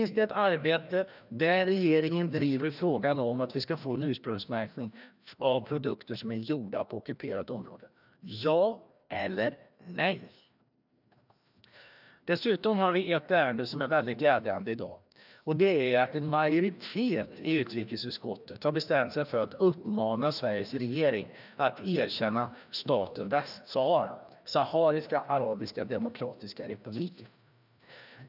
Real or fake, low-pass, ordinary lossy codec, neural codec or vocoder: fake; 5.4 kHz; none; codec, 16 kHz, 1 kbps, FreqCodec, larger model